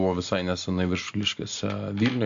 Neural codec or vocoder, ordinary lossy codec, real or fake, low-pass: none; AAC, 64 kbps; real; 7.2 kHz